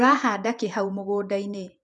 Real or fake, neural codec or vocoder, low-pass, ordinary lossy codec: fake; vocoder, 48 kHz, 128 mel bands, Vocos; 10.8 kHz; none